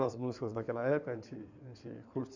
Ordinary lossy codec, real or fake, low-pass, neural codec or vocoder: none; fake; 7.2 kHz; codec, 16 kHz in and 24 kHz out, 2.2 kbps, FireRedTTS-2 codec